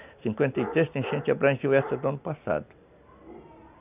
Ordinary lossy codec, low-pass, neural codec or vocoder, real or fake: none; 3.6 kHz; none; real